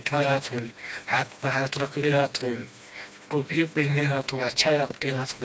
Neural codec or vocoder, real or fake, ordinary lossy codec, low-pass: codec, 16 kHz, 1 kbps, FreqCodec, smaller model; fake; none; none